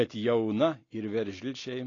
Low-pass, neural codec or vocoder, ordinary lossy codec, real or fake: 7.2 kHz; none; AAC, 32 kbps; real